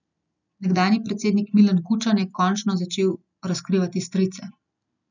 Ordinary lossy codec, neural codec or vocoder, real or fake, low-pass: none; none; real; 7.2 kHz